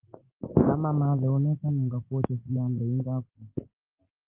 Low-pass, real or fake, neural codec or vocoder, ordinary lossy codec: 3.6 kHz; real; none; Opus, 16 kbps